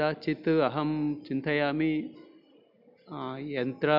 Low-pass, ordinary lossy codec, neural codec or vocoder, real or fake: 5.4 kHz; none; none; real